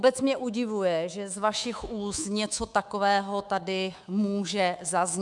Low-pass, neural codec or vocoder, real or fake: 10.8 kHz; codec, 24 kHz, 3.1 kbps, DualCodec; fake